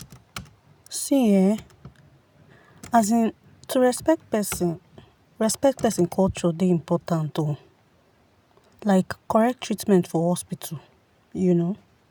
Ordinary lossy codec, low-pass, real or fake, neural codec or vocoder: none; none; real; none